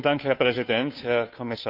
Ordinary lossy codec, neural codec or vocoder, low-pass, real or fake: none; codec, 16 kHz, 2 kbps, FunCodec, trained on Chinese and English, 25 frames a second; 5.4 kHz; fake